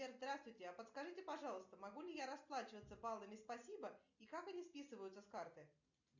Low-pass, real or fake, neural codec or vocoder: 7.2 kHz; real; none